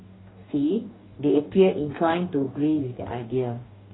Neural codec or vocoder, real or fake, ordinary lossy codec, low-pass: codec, 44.1 kHz, 2.6 kbps, DAC; fake; AAC, 16 kbps; 7.2 kHz